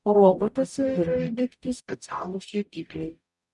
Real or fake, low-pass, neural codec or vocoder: fake; 10.8 kHz; codec, 44.1 kHz, 0.9 kbps, DAC